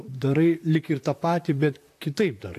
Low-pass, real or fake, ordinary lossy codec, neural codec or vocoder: 14.4 kHz; fake; AAC, 64 kbps; vocoder, 44.1 kHz, 128 mel bands, Pupu-Vocoder